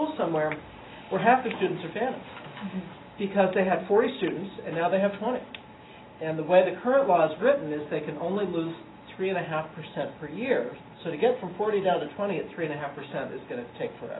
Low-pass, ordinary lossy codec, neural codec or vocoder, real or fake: 7.2 kHz; AAC, 16 kbps; none; real